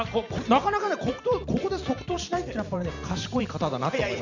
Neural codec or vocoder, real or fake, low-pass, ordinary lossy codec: vocoder, 44.1 kHz, 128 mel bands every 512 samples, BigVGAN v2; fake; 7.2 kHz; none